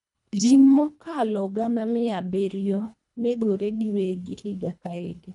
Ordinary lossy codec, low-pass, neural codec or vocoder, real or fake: none; 10.8 kHz; codec, 24 kHz, 1.5 kbps, HILCodec; fake